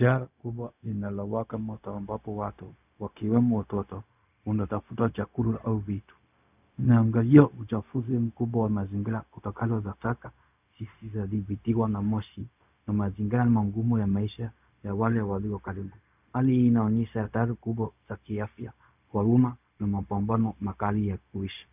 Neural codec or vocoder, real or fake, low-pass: codec, 16 kHz, 0.4 kbps, LongCat-Audio-Codec; fake; 3.6 kHz